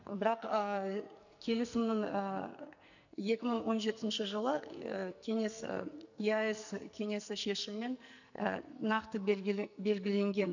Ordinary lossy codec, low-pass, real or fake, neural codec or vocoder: none; 7.2 kHz; fake; codec, 44.1 kHz, 2.6 kbps, SNAC